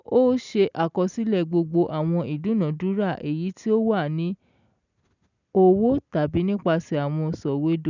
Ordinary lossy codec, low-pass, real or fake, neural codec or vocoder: none; 7.2 kHz; real; none